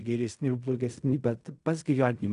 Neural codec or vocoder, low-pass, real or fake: codec, 16 kHz in and 24 kHz out, 0.4 kbps, LongCat-Audio-Codec, fine tuned four codebook decoder; 10.8 kHz; fake